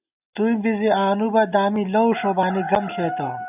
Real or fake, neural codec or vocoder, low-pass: real; none; 3.6 kHz